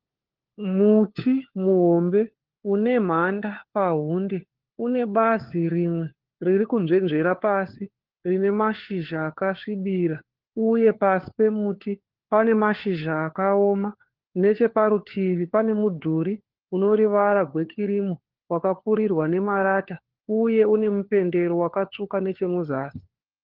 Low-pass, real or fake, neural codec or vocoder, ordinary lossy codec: 5.4 kHz; fake; codec, 16 kHz, 4 kbps, FunCodec, trained on LibriTTS, 50 frames a second; Opus, 16 kbps